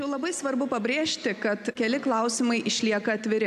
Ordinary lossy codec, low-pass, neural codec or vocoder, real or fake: MP3, 96 kbps; 14.4 kHz; none; real